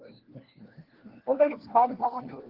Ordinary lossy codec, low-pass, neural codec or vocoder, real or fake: Opus, 16 kbps; 5.4 kHz; codec, 16 kHz, 1 kbps, FunCodec, trained on LibriTTS, 50 frames a second; fake